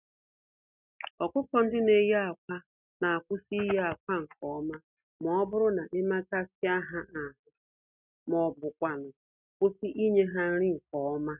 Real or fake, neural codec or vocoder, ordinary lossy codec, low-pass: real; none; none; 3.6 kHz